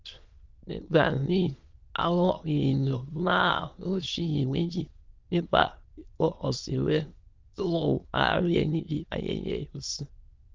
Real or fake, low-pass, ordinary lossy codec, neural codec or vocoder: fake; 7.2 kHz; Opus, 32 kbps; autoencoder, 22.05 kHz, a latent of 192 numbers a frame, VITS, trained on many speakers